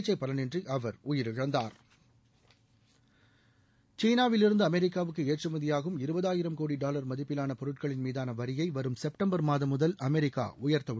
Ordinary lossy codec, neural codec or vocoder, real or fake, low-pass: none; none; real; none